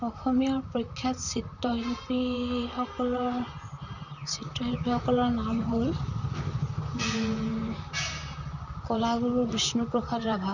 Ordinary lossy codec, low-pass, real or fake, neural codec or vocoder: none; 7.2 kHz; fake; vocoder, 44.1 kHz, 128 mel bands, Pupu-Vocoder